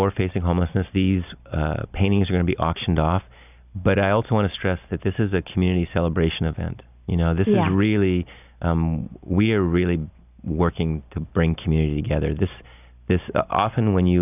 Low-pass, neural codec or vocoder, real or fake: 3.6 kHz; none; real